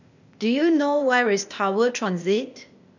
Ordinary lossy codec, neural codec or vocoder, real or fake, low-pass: none; codec, 16 kHz, 0.8 kbps, ZipCodec; fake; 7.2 kHz